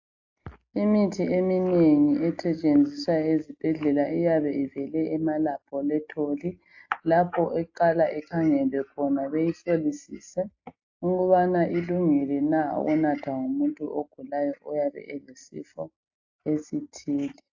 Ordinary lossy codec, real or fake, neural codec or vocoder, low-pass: AAC, 48 kbps; real; none; 7.2 kHz